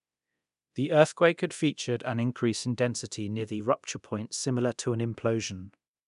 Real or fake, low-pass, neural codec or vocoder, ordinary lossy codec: fake; 10.8 kHz; codec, 24 kHz, 0.9 kbps, DualCodec; none